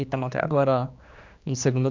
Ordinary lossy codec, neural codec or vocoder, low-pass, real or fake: MP3, 64 kbps; codec, 16 kHz, 2 kbps, X-Codec, HuBERT features, trained on general audio; 7.2 kHz; fake